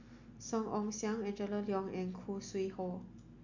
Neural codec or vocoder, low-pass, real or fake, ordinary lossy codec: none; 7.2 kHz; real; none